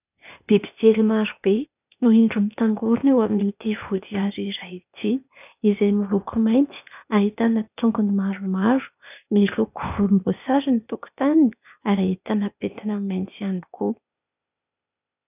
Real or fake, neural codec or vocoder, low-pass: fake; codec, 16 kHz, 0.8 kbps, ZipCodec; 3.6 kHz